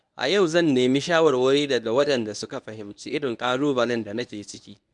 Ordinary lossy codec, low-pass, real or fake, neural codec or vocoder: none; 10.8 kHz; fake; codec, 24 kHz, 0.9 kbps, WavTokenizer, medium speech release version 1